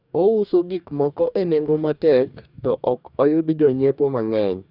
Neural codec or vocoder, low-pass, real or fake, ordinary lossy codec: codec, 44.1 kHz, 2.6 kbps, DAC; 5.4 kHz; fake; none